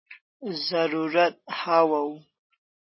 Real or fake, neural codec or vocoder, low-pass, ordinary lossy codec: real; none; 7.2 kHz; MP3, 24 kbps